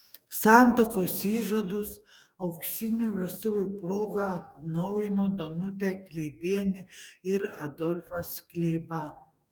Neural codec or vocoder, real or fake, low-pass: codec, 44.1 kHz, 2.6 kbps, DAC; fake; 19.8 kHz